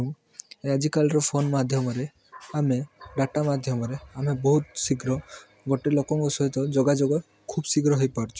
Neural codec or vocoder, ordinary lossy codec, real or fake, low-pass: none; none; real; none